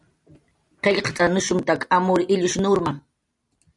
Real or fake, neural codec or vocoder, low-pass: real; none; 10.8 kHz